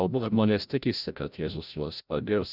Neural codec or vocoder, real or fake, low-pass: codec, 16 kHz, 0.5 kbps, FreqCodec, larger model; fake; 5.4 kHz